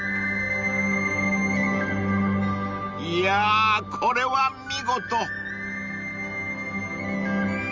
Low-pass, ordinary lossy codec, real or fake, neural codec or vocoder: 7.2 kHz; Opus, 32 kbps; real; none